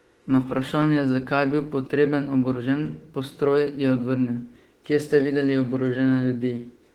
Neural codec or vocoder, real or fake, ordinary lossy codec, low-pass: autoencoder, 48 kHz, 32 numbers a frame, DAC-VAE, trained on Japanese speech; fake; Opus, 16 kbps; 19.8 kHz